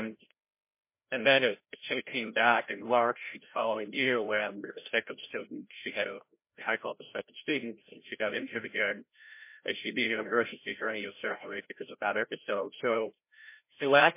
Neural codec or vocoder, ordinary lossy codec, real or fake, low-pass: codec, 16 kHz, 0.5 kbps, FreqCodec, larger model; MP3, 24 kbps; fake; 3.6 kHz